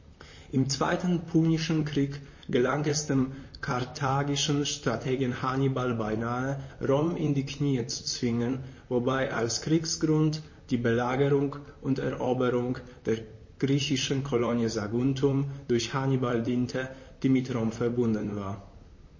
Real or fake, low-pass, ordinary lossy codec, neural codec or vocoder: fake; 7.2 kHz; MP3, 32 kbps; vocoder, 44.1 kHz, 128 mel bands, Pupu-Vocoder